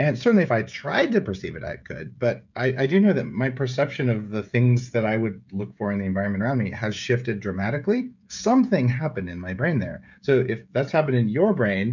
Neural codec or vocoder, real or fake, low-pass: codec, 16 kHz, 16 kbps, FreqCodec, smaller model; fake; 7.2 kHz